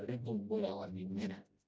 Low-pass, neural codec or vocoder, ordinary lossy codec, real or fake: none; codec, 16 kHz, 0.5 kbps, FreqCodec, smaller model; none; fake